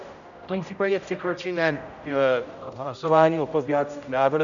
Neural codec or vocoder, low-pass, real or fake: codec, 16 kHz, 0.5 kbps, X-Codec, HuBERT features, trained on general audio; 7.2 kHz; fake